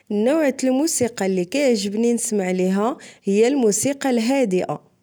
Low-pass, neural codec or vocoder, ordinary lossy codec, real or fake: none; none; none; real